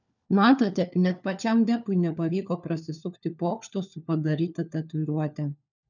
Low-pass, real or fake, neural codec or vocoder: 7.2 kHz; fake; codec, 16 kHz, 4 kbps, FunCodec, trained on LibriTTS, 50 frames a second